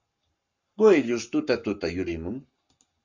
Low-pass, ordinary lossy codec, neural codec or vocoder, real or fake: 7.2 kHz; Opus, 64 kbps; codec, 44.1 kHz, 7.8 kbps, Pupu-Codec; fake